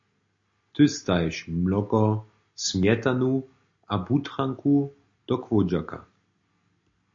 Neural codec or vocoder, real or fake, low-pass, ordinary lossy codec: none; real; 7.2 kHz; MP3, 48 kbps